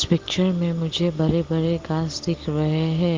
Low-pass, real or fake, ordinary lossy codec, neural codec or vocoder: 7.2 kHz; real; Opus, 16 kbps; none